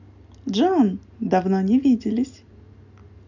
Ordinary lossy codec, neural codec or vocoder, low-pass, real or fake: none; none; 7.2 kHz; real